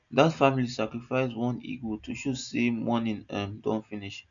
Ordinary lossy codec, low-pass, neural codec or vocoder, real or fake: none; 7.2 kHz; none; real